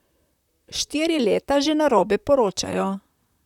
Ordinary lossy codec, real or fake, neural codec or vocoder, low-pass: none; fake; vocoder, 44.1 kHz, 128 mel bands, Pupu-Vocoder; 19.8 kHz